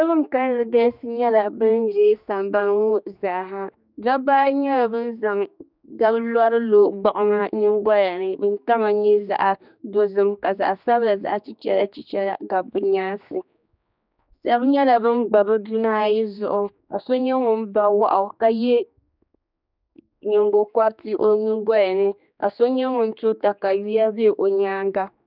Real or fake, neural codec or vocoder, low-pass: fake; codec, 16 kHz, 2 kbps, X-Codec, HuBERT features, trained on general audio; 5.4 kHz